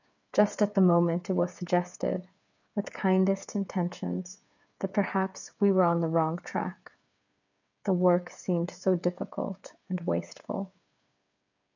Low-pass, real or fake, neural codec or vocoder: 7.2 kHz; fake; codec, 16 kHz, 8 kbps, FreqCodec, smaller model